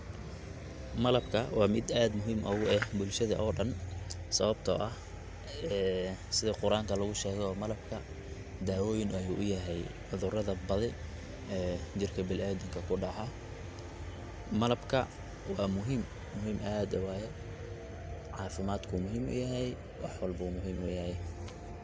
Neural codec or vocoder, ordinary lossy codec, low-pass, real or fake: none; none; none; real